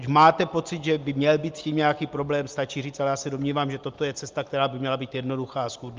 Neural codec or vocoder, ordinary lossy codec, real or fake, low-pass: none; Opus, 24 kbps; real; 7.2 kHz